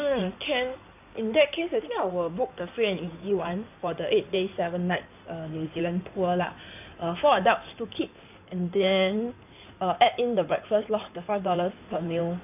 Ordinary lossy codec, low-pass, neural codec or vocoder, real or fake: none; 3.6 kHz; codec, 16 kHz in and 24 kHz out, 2.2 kbps, FireRedTTS-2 codec; fake